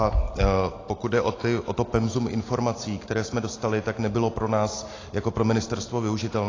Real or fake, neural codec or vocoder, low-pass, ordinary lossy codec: real; none; 7.2 kHz; AAC, 32 kbps